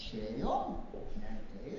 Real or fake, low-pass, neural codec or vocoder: real; 7.2 kHz; none